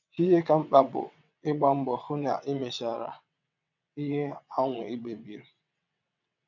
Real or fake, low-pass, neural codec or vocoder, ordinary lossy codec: fake; 7.2 kHz; vocoder, 22.05 kHz, 80 mel bands, WaveNeXt; none